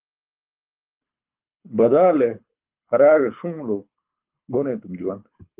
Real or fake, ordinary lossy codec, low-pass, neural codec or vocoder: fake; Opus, 32 kbps; 3.6 kHz; codec, 24 kHz, 6 kbps, HILCodec